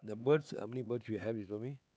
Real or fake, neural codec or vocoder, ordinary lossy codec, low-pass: fake; codec, 16 kHz, 4 kbps, X-Codec, HuBERT features, trained on LibriSpeech; none; none